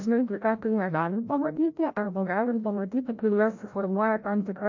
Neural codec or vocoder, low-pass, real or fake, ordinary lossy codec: codec, 16 kHz, 0.5 kbps, FreqCodec, larger model; 7.2 kHz; fake; MP3, 48 kbps